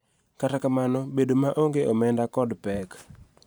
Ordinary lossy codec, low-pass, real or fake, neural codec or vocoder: none; none; fake; vocoder, 44.1 kHz, 128 mel bands every 512 samples, BigVGAN v2